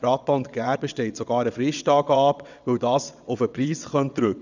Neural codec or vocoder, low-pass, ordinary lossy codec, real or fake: vocoder, 22.05 kHz, 80 mel bands, WaveNeXt; 7.2 kHz; none; fake